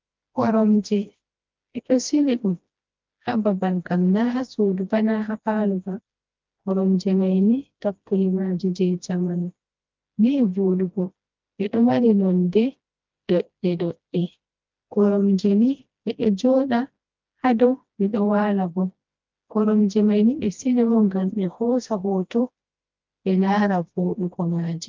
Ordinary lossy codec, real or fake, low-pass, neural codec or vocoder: Opus, 24 kbps; fake; 7.2 kHz; codec, 16 kHz, 1 kbps, FreqCodec, smaller model